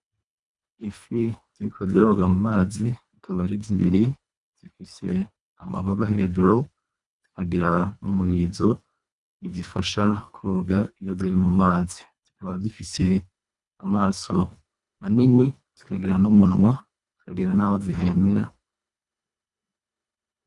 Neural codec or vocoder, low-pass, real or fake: codec, 24 kHz, 1.5 kbps, HILCodec; 10.8 kHz; fake